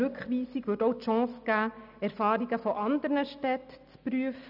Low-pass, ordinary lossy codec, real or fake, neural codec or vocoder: 5.4 kHz; none; real; none